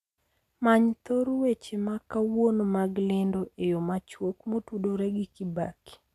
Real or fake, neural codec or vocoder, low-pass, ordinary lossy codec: real; none; 14.4 kHz; none